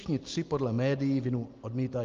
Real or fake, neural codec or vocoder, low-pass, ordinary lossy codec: real; none; 7.2 kHz; Opus, 32 kbps